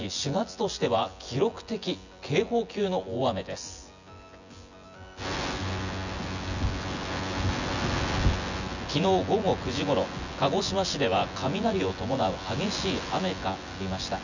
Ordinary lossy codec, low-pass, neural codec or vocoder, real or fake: none; 7.2 kHz; vocoder, 24 kHz, 100 mel bands, Vocos; fake